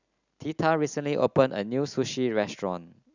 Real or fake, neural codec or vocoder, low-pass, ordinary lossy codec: real; none; 7.2 kHz; none